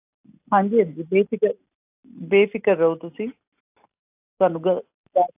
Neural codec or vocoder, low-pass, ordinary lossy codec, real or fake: none; 3.6 kHz; AAC, 24 kbps; real